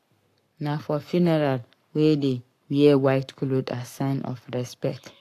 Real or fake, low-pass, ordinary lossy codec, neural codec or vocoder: fake; 14.4 kHz; AAC, 64 kbps; codec, 44.1 kHz, 7.8 kbps, Pupu-Codec